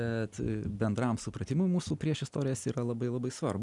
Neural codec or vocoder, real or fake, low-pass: none; real; 10.8 kHz